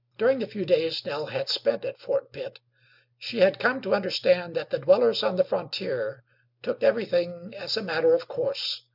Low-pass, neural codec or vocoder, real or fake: 5.4 kHz; none; real